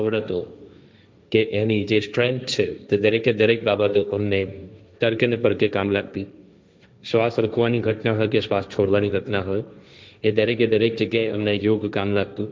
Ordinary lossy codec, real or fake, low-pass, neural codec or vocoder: none; fake; none; codec, 16 kHz, 1.1 kbps, Voila-Tokenizer